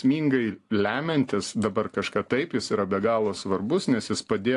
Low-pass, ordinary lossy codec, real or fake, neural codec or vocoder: 10.8 kHz; AAC, 48 kbps; real; none